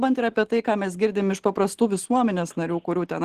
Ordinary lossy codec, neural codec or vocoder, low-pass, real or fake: Opus, 16 kbps; none; 14.4 kHz; real